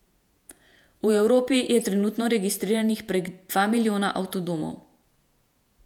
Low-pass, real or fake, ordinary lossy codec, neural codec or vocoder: 19.8 kHz; fake; none; vocoder, 48 kHz, 128 mel bands, Vocos